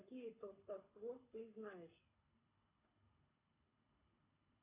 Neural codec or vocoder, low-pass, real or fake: codec, 24 kHz, 6 kbps, HILCodec; 3.6 kHz; fake